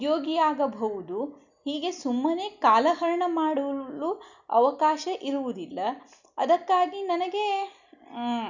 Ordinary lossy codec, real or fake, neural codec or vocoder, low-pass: none; real; none; 7.2 kHz